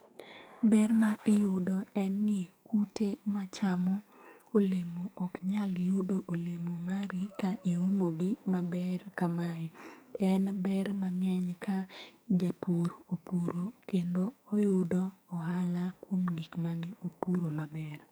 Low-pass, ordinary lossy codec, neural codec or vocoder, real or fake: none; none; codec, 44.1 kHz, 2.6 kbps, SNAC; fake